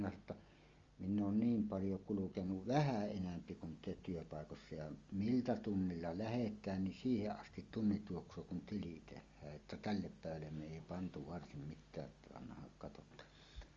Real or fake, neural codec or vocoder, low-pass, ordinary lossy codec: real; none; 7.2 kHz; Opus, 32 kbps